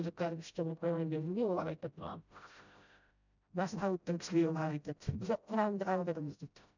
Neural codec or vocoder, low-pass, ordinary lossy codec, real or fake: codec, 16 kHz, 0.5 kbps, FreqCodec, smaller model; 7.2 kHz; none; fake